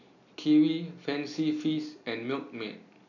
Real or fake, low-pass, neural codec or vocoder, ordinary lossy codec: real; 7.2 kHz; none; none